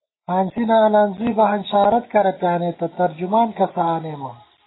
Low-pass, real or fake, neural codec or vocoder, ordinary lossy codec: 7.2 kHz; real; none; AAC, 16 kbps